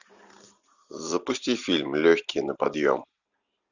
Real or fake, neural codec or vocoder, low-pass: real; none; 7.2 kHz